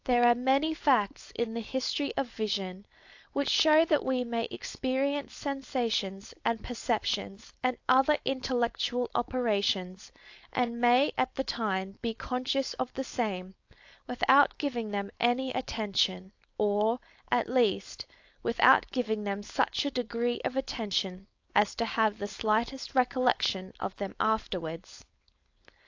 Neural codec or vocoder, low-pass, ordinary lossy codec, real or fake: codec, 16 kHz, 4.8 kbps, FACodec; 7.2 kHz; AAC, 48 kbps; fake